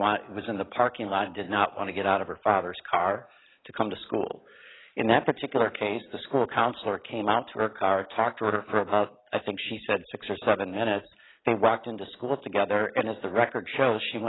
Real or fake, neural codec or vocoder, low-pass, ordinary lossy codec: real; none; 7.2 kHz; AAC, 16 kbps